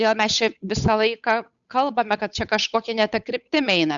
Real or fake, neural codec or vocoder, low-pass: fake; codec, 16 kHz, 8 kbps, FunCodec, trained on Chinese and English, 25 frames a second; 7.2 kHz